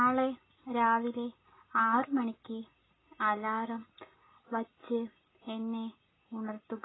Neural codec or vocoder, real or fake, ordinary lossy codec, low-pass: none; real; AAC, 16 kbps; 7.2 kHz